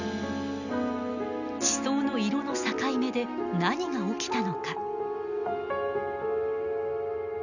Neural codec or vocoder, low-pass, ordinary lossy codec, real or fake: none; 7.2 kHz; none; real